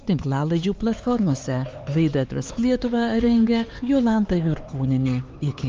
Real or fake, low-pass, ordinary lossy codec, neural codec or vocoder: fake; 7.2 kHz; Opus, 24 kbps; codec, 16 kHz, 4 kbps, X-Codec, HuBERT features, trained on LibriSpeech